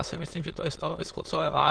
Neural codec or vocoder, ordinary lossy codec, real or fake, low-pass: autoencoder, 22.05 kHz, a latent of 192 numbers a frame, VITS, trained on many speakers; Opus, 16 kbps; fake; 9.9 kHz